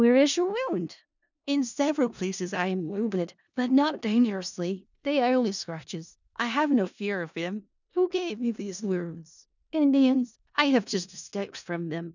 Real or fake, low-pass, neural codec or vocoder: fake; 7.2 kHz; codec, 16 kHz in and 24 kHz out, 0.4 kbps, LongCat-Audio-Codec, four codebook decoder